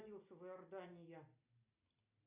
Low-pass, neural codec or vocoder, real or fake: 3.6 kHz; none; real